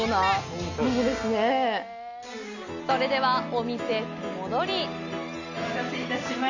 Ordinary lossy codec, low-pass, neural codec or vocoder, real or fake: none; 7.2 kHz; none; real